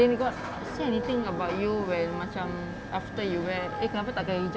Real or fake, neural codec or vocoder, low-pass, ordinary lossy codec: real; none; none; none